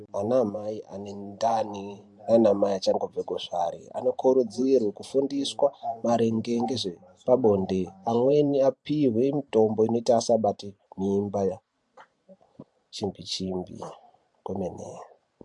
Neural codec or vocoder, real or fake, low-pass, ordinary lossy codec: vocoder, 44.1 kHz, 128 mel bands every 512 samples, BigVGAN v2; fake; 10.8 kHz; MP3, 64 kbps